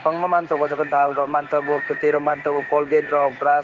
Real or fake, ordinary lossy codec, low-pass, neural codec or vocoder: fake; Opus, 16 kbps; 7.2 kHz; codec, 16 kHz in and 24 kHz out, 1 kbps, XY-Tokenizer